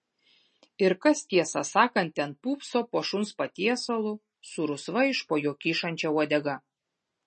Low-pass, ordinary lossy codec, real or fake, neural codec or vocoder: 10.8 kHz; MP3, 32 kbps; real; none